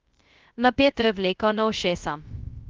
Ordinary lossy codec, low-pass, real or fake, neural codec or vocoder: Opus, 24 kbps; 7.2 kHz; fake; codec, 16 kHz, 0.2 kbps, FocalCodec